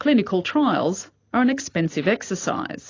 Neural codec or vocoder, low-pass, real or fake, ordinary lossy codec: none; 7.2 kHz; real; AAC, 32 kbps